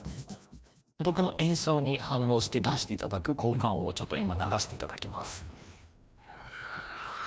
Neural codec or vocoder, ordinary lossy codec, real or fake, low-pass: codec, 16 kHz, 1 kbps, FreqCodec, larger model; none; fake; none